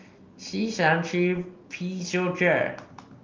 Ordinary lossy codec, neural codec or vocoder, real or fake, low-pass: Opus, 32 kbps; none; real; 7.2 kHz